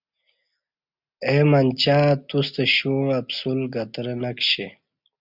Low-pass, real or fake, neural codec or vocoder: 5.4 kHz; real; none